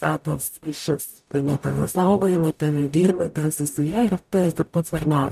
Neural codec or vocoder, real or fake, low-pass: codec, 44.1 kHz, 0.9 kbps, DAC; fake; 14.4 kHz